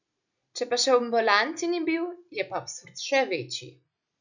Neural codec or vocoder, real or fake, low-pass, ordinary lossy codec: none; real; 7.2 kHz; none